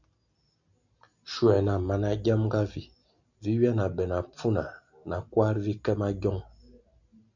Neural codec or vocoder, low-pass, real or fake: none; 7.2 kHz; real